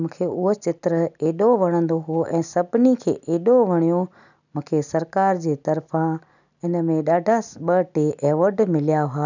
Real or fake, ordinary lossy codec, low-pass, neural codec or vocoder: real; none; 7.2 kHz; none